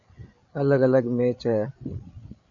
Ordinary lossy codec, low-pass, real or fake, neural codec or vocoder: MP3, 96 kbps; 7.2 kHz; fake; codec, 16 kHz, 8 kbps, FreqCodec, larger model